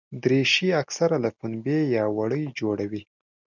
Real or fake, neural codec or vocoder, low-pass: real; none; 7.2 kHz